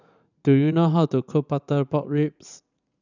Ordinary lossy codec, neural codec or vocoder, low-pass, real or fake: none; vocoder, 44.1 kHz, 80 mel bands, Vocos; 7.2 kHz; fake